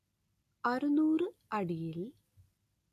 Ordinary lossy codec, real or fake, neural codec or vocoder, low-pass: AAC, 64 kbps; real; none; 14.4 kHz